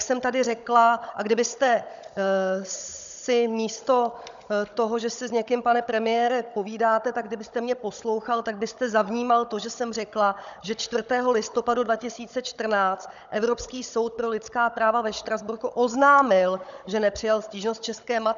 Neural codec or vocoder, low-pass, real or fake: codec, 16 kHz, 16 kbps, FunCodec, trained on Chinese and English, 50 frames a second; 7.2 kHz; fake